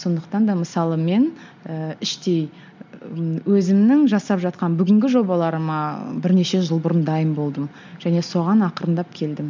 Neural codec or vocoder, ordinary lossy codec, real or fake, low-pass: none; MP3, 64 kbps; real; 7.2 kHz